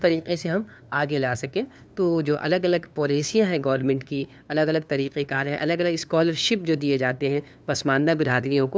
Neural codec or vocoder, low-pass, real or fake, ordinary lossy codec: codec, 16 kHz, 2 kbps, FunCodec, trained on LibriTTS, 25 frames a second; none; fake; none